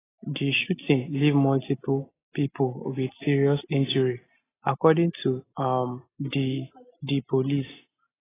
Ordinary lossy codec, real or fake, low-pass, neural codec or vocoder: AAC, 16 kbps; real; 3.6 kHz; none